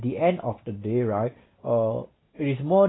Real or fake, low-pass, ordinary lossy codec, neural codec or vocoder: real; 7.2 kHz; AAC, 16 kbps; none